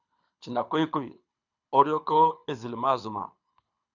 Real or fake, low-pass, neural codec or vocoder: fake; 7.2 kHz; codec, 24 kHz, 6 kbps, HILCodec